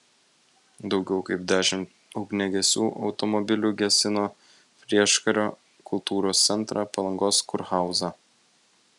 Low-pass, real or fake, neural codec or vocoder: 10.8 kHz; real; none